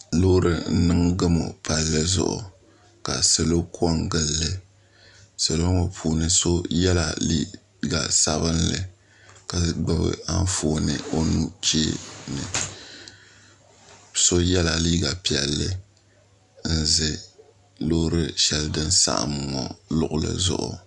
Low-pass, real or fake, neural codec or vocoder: 10.8 kHz; real; none